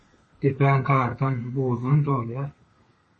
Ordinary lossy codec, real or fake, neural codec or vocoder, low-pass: MP3, 32 kbps; fake; codec, 32 kHz, 1.9 kbps, SNAC; 10.8 kHz